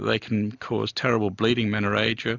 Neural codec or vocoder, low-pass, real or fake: none; 7.2 kHz; real